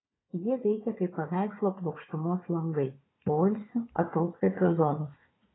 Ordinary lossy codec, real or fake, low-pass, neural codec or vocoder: AAC, 16 kbps; fake; 7.2 kHz; codec, 16 kHz, 4 kbps, FunCodec, trained on Chinese and English, 50 frames a second